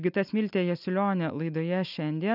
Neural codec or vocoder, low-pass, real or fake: none; 5.4 kHz; real